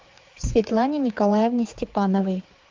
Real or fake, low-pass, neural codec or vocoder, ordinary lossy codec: fake; 7.2 kHz; codec, 16 kHz, 4 kbps, X-Codec, HuBERT features, trained on general audio; Opus, 32 kbps